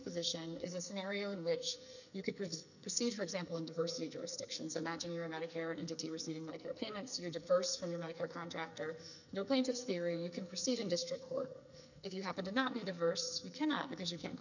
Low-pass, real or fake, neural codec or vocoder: 7.2 kHz; fake; codec, 44.1 kHz, 2.6 kbps, SNAC